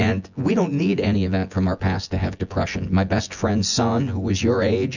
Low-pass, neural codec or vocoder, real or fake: 7.2 kHz; vocoder, 24 kHz, 100 mel bands, Vocos; fake